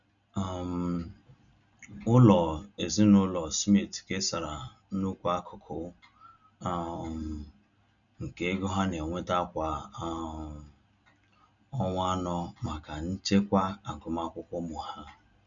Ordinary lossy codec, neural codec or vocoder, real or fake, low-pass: none; none; real; 7.2 kHz